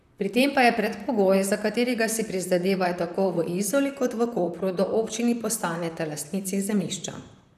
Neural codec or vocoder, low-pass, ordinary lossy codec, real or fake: vocoder, 44.1 kHz, 128 mel bands, Pupu-Vocoder; 14.4 kHz; none; fake